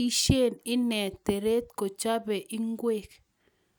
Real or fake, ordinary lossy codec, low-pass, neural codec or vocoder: real; none; none; none